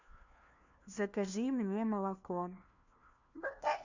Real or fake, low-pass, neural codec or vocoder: fake; 7.2 kHz; codec, 16 kHz, 1 kbps, FunCodec, trained on LibriTTS, 50 frames a second